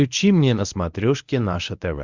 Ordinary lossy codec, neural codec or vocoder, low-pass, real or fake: Opus, 64 kbps; codec, 24 kHz, 0.9 kbps, WavTokenizer, medium speech release version 1; 7.2 kHz; fake